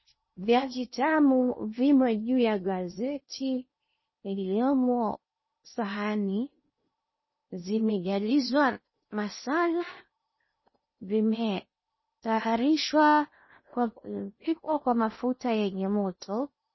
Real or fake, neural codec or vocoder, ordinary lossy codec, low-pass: fake; codec, 16 kHz in and 24 kHz out, 0.6 kbps, FocalCodec, streaming, 2048 codes; MP3, 24 kbps; 7.2 kHz